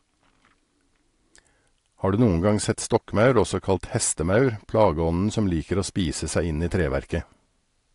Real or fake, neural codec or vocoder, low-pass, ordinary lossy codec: real; none; 10.8 kHz; AAC, 48 kbps